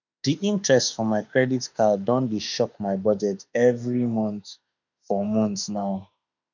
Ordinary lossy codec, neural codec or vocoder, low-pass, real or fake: none; autoencoder, 48 kHz, 32 numbers a frame, DAC-VAE, trained on Japanese speech; 7.2 kHz; fake